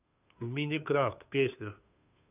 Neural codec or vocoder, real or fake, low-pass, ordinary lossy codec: codec, 16 kHz, 2 kbps, FunCodec, trained on Chinese and English, 25 frames a second; fake; 3.6 kHz; none